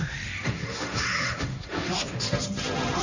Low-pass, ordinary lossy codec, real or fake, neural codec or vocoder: 7.2 kHz; none; fake; codec, 16 kHz, 1.1 kbps, Voila-Tokenizer